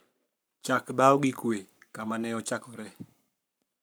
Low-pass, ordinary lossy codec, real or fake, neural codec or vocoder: none; none; fake; codec, 44.1 kHz, 7.8 kbps, Pupu-Codec